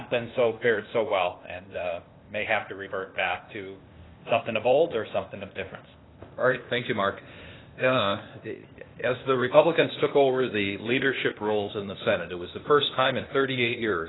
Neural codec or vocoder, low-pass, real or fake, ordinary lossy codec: codec, 16 kHz, 0.8 kbps, ZipCodec; 7.2 kHz; fake; AAC, 16 kbps